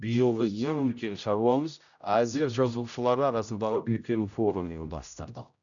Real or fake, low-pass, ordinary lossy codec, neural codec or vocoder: fake; 7.2 kHz; none; codec, 16 kHz, 0.5 kbps, X-Codec, HuBERT features, trained on general audio